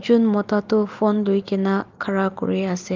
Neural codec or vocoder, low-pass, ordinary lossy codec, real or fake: none; 7.2 kHz; Opus, 32 kbps; real